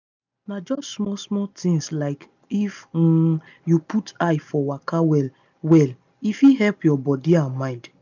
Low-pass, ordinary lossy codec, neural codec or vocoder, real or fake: 7.2 kHz; none; none; real